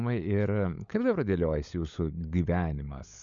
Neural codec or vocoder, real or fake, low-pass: codec, 16 kHz, 16 kbps, FunCodec, trained on LibriTTS, 50 frames a second; fake; 7.2 kHz